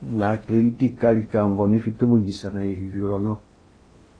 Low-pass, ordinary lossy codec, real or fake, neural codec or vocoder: 9.9 kHz; AAC, 32 kbps; fake; codec, 16 kHz in and 24 kHz out, 0.6 kbps, FocalCodec, streaming, 4096 codes